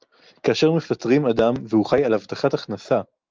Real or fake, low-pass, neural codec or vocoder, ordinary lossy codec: real; 7.2 kHz; none; Opus, 24 kbps